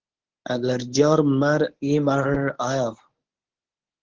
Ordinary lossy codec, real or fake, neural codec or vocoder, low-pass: Opus, 16 kbps; fake; codec, 24 kHz, 0.9 kbps, WavTokenizer, medium speech release version 1; 7.2 kHz